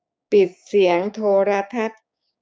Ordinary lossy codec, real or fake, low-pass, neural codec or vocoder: none; fake; none; codec, 16 kHz, 6 kbps, DAC